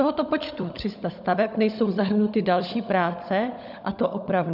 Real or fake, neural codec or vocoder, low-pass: fake; codec, 16 kHz, 16 kbps, FunCodec, trained on LibriTTS, 50 frames a second; 5.4 kHz